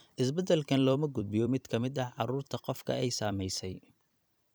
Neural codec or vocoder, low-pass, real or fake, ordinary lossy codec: vocoder, 44.1 kHz, 128 mel bands every 256 samples, BigVGAN v2; none; fake; none